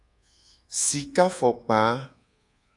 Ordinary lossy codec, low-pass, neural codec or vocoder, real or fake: AAC, 48 kbps; 10.8 kHz; codec, 24 kHz, 1.2 kbps, DualCodec; fake